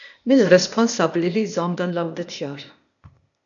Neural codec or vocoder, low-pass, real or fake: codec, 16 kHz, 0.8 kbps, ZipCodec; 7.2 kHz; fake